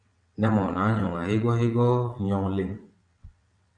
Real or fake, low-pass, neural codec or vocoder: fake; 9.9 kHz; vocoder, 22.05 kHz, 80 mel bands, WaveNeXt